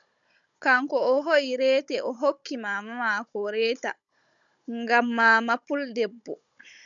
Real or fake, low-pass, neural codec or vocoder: fake; 7.2 kHz; codec, 16 kHz, 16 kbps, FunCodec, trained on Chinese and English, 50 frames a second